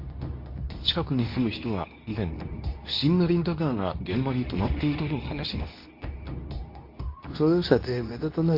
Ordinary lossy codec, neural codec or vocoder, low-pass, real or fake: MP3, 32 kbps; codec, 24 kHz, 0.9 kbps, WavTokenizer, medium speech release version 2; 5.4 kHz; fake